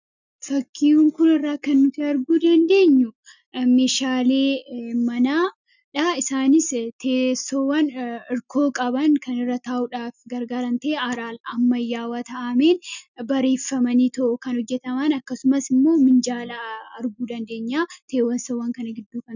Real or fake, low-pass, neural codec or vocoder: real; 7.2 kHz; none